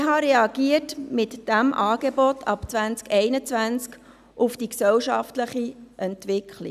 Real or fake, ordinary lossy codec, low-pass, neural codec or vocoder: real; none; 14.4 kHz; none